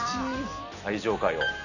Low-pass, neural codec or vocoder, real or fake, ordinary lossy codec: 7.2 kHz; none; real; none